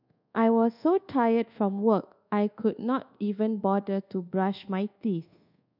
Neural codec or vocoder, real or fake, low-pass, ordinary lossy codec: codec, 24 kHz, 1.2 kbps, DualCodec; fake; 5.4 kHz; none